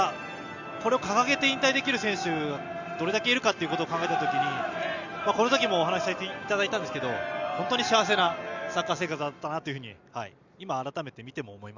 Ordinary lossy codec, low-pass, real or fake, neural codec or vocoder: Opus, 64 kbps; 7.2 kHz; real; none